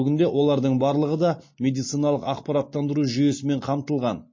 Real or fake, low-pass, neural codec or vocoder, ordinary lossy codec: real; 7.2 kHz; none; MP3, 32 kbps